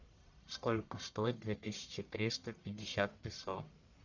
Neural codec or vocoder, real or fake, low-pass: codec, 44.1 kHz, 1.7 kbps, Pupu-Codec; fake; 7.2 kHz